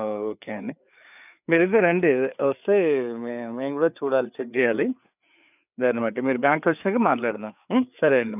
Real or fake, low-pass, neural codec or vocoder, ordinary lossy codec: fake; 3.6 kHz; codec, 16 kHz, 4 kbps, FreqCodec, larger model; none